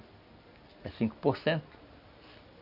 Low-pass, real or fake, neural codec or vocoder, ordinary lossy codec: 5.4 kHz; real; none; none